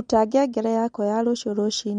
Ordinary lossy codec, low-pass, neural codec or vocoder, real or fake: MP3, 48 kbps; 9.9 kHz; none; real